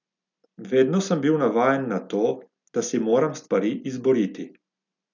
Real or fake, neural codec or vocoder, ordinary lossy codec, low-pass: real; none; none; 7.2 kHz